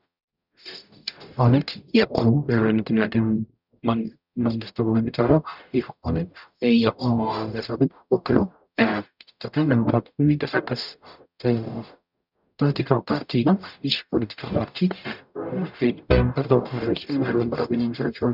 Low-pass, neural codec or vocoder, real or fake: 5.4 kHz; codec, 44.1 kHz, 0.9 kbps, DAC; fake